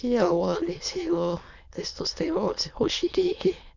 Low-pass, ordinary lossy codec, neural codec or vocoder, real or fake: 7.2 kHz; none; autoencoder, 22.05 kHz, a latent of 192 numbers a frame, VITS, trained on many speakers; fake